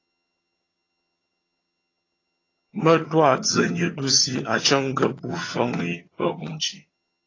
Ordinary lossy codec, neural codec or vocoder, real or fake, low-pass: AAC, 32 kbps; vocoder, 22.05 kHz, 80 mel bands, HiFi-GAN; fake; 7.2 kHz